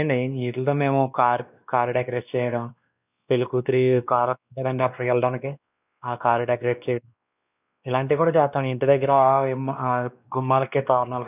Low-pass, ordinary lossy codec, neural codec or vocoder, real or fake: 3.6 kHz; none; codec, 16 kHz, 2 kbps, X-Codec, WavLM features, trained on Multilingual LibriSpeech; fake